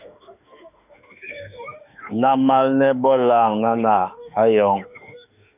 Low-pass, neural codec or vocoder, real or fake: 3.6 kHz; autoencoder, 48 kHz, 32 numbers a frame, DAC-VAE, trained on Japanese speech; fake